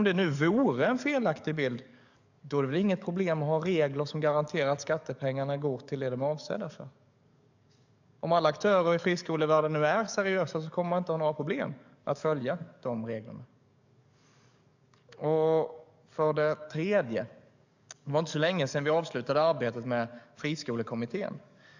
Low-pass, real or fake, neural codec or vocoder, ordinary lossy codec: 7.2 kHz; fake; codec, 44.1 kHz, 7.8 kbps, DAC; none